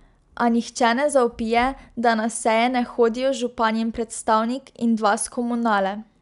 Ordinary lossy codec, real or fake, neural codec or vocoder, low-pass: none; real; none; 10.8 kHz